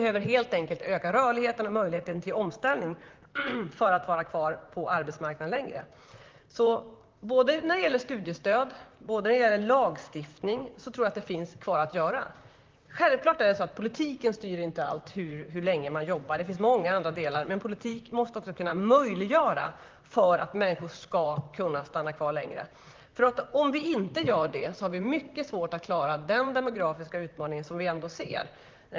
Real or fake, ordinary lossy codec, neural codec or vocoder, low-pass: fake; Opus, 32 kbps; vocoder, 44.1 kHz, 128 mel bands, Pupu-Vocoder; 7.2 kHz